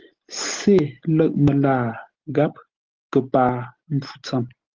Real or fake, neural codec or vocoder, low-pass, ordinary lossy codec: real; none; 7.2 kHz; Opus, 16 kbps